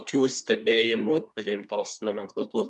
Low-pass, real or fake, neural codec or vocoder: 10.8 kHz; fake; codec, 24 kHz, 1 kbps, SNAC